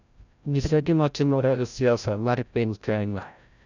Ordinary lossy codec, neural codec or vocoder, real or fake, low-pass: none; codec, 16 kHz, 0.5 kbps, FreqCodec, larger model; fake; 7.2 kHz